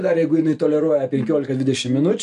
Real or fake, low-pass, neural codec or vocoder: real; 10.8 kHz; none